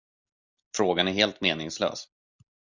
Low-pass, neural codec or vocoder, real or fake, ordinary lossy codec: 7.2 kHz; none; real; Opus, 64 kbps